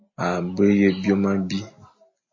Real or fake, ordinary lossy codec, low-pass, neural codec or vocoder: real; MP3, 32 kbps; 7.2 kHz; none